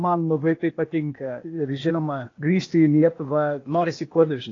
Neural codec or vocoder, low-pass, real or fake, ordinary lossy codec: codec, 16 kHz, 0.8 kbps, ZipCodec; 7.2 kHz; fake; AAC, 32 kbps